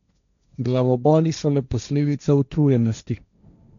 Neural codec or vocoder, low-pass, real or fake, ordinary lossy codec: codec, 16 kHz, 1.1 kbps, Voila-Tokenizer; 7.2 kHz; fake; none